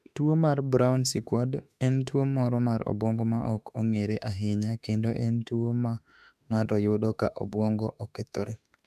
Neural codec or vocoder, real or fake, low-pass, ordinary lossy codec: autoencoder, 48 kHz, 32 numbers a frame, DAC-VAE, trained on Japanese speech; fake; 14.4 kHz; none